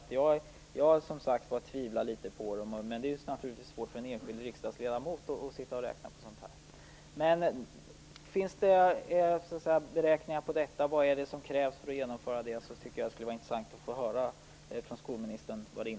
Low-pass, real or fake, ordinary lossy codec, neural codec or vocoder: none; real; none; none